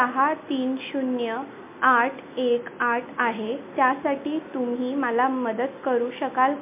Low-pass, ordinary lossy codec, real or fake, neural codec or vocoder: 3.6 kHz; MP3, 24 kbps; real; none